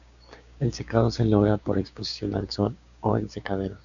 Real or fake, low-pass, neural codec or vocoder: fake; 7.2 kHz; codec, 16 kHz, 6 kbps, DAC